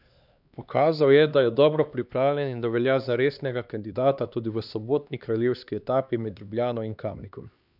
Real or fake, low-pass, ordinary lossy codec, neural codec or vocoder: fake; 5.4 kHz; none; codec, 16 kHz, 2 kbps, X-Codec, HuBERT features, trained on LibriSpeech